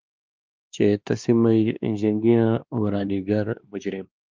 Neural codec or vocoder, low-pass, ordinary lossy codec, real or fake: codec, 16 kHz, 2 kbps, X-Codec, WavLM features, trained on Multilingual LibriSpeech; 7.2 kHz; Opus, 32 kbps; fake